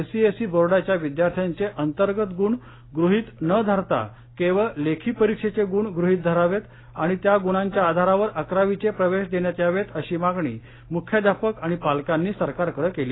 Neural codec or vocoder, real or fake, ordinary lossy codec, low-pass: none; real; AAC, 16 kbps; 7.2 kHz